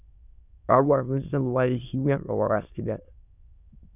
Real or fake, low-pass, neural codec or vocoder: fake; 3.6 kHz; autoencoder, 22.05 kHz, a latent of 192 numbers a frame, VITS, trained on many speakers